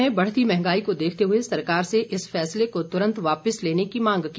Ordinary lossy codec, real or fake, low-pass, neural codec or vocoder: none; real; none; none